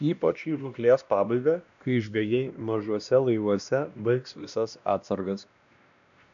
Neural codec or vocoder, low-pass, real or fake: codec, 16 kHz, 1 kbps, X-Codec, WavLM features, trained on Multilingual LibriSpeech; 7.2 kHz; fake